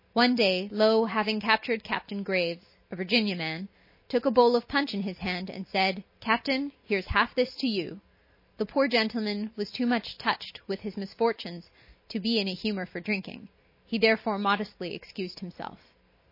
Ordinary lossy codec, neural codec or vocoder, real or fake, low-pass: MP3, 24 kbps; none; real; 5.4 kHz